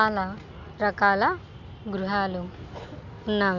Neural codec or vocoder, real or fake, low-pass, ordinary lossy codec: none; real; 7.2 kHz; none